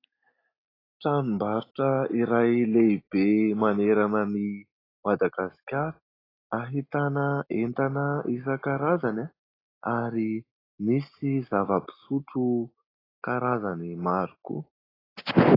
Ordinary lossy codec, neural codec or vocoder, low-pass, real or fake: AAC, 24 kbps; none; 5.4 kHz; real